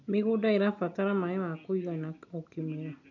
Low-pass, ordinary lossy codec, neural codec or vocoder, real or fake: 7.2 kHz; none; none; real